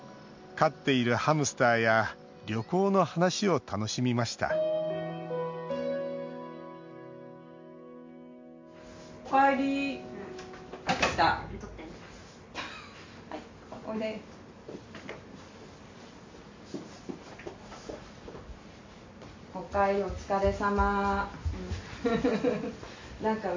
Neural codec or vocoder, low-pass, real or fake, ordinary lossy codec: none; 7.2 kHz; real; MP3, 48 kbps